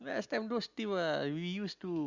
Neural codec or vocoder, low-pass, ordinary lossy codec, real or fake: none; 7.2 kHz; Opus, 64 kbps; real